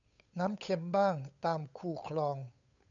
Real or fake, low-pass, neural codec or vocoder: fake; 7.2 kHz; codec, 16 kHz, 8 kbps, FunCodec, trained on Chinese and English, 25 frames a second